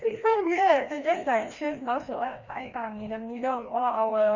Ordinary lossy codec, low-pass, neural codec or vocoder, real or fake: Opus, 64 kbps; 7.2 kHz; codec, 16 kHz, 1 kbps, FreqCodec, larger model; fake